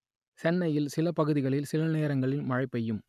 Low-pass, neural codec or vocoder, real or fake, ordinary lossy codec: 14.4 kHz; none; real; none